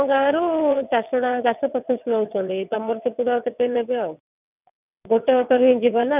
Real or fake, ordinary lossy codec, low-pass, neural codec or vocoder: fake; none; 3.6 kHz; vocoder, 22.05 kHz, 80 mel bands, WaveNeXt